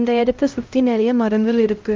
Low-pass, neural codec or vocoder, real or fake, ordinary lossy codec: 7.2 kHz; codec, 16 kHz, 0.5 kbps, X-Codec, HuBERT features, trained on LibriSpeech; fake; Opus, 24 kbps